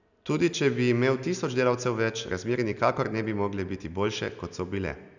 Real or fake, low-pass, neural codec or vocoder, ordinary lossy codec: real; 7.2 kHz; none; none